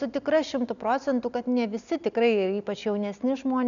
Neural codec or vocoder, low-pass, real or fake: none; 7.2 kHz; real